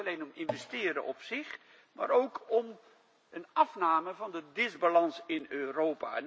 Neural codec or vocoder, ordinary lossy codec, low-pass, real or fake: none; none; none; real